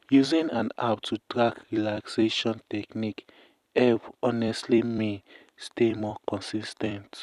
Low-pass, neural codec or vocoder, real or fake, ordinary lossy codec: 14.4 kHz; vocoder, 44.1 kHz, 128 mel bands every 256 samples, BigVGAN v2; fake; none